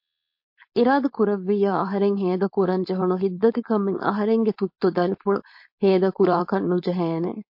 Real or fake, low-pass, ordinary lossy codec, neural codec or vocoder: fake; 5.4 kHz; MP3, 32 kbps; codec, 16 kHz, 4.8 kbps, FACodec